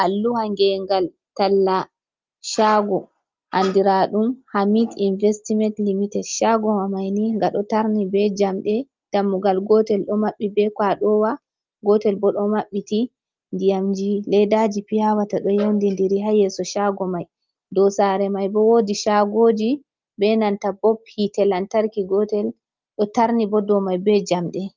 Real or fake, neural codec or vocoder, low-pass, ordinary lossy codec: real; none; 7.2 kHz; Opus, 32 kbps